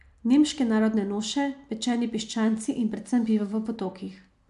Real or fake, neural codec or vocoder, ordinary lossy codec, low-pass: real; none; AAC, 96 kbps; 10.8 kHz